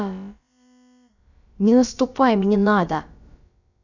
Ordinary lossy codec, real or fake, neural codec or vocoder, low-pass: none; fake; codec, 16 kHz, about 1 kbps, DyCAST, with the encoder's durations; 7.2 kHz